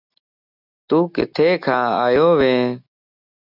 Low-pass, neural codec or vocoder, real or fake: 5.4 kHz; none; real